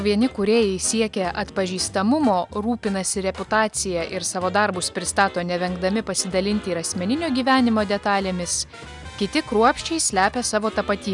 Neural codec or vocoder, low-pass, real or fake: none; 10.8 kHz; real